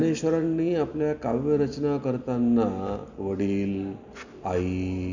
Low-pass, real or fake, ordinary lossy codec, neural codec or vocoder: 7.2 kHz; real; none; none